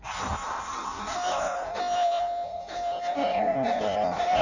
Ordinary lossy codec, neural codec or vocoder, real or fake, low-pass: none; codec, 16 kHz in and 24 kHz out, 0.6 kbps, FireRedTTS-2 codec; fake; 7.2 kHz